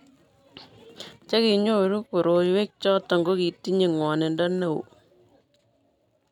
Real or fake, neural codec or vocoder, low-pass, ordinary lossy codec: real; none; 19.8 kHz; none